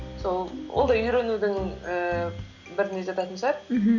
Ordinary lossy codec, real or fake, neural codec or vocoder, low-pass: none; real; none; 7.2 kHz